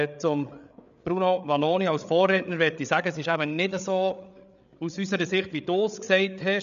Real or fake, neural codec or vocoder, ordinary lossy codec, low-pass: fake; codec, 16 kHz, 8 kbps, FreqCodec, larger model; none; 7.2 kHz